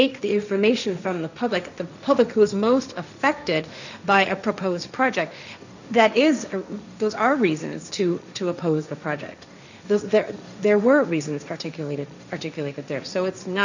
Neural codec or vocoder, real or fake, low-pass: codec, 16 kHz, 1.1 kbps, Voila-Tokenizer; fake; 7.2 kHz